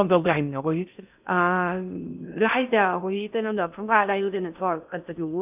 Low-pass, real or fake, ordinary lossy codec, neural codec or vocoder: 3.6 kHz; fake; none; codec, 16 kHz in and 24 kHz out, 0.6 kbps, FocalCodec, streaming, 4096 codes